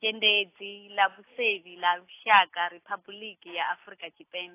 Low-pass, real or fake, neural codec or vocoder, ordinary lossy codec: 3.6 kHz; real; none; AAC, 24 kbps